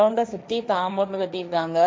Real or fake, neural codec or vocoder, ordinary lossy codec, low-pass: fake; codec, 16 kHz, 1.1 kbps, Voila-Tokenizer; none; none